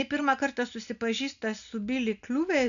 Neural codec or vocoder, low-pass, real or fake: none; 7.2 kHz; real